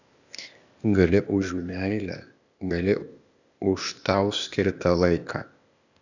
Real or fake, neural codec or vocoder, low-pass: fake; codec, 16 kHz, 0.8 kbps, ZipCodec; 7.2 kHz